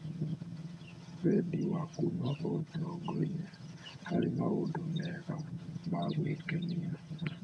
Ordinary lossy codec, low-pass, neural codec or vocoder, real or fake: none; none; vocoder, 22.05 kHz, 80 mel bands, HiFi-GAN; fake